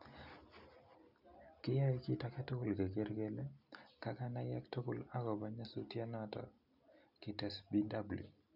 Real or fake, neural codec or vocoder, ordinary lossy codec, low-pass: real; none; none; 5.4 kHz